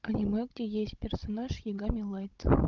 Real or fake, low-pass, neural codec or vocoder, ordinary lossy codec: fake; 7.2 kHz; codec, 16 kHz, 16 kbps, FunCodec, trained on Chinese and English, 50 frames a second; Opus, 24 kbps